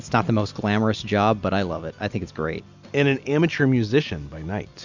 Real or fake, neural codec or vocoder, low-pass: real; none; 7.2 kHz